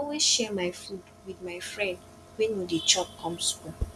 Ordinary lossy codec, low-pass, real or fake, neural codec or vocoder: none; none; real; none